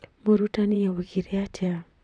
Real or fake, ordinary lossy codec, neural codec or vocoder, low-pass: fake; none; vocoder, 44.1 kHz, 128 mel bands, Pupu-Vocoder; 9.9 kHz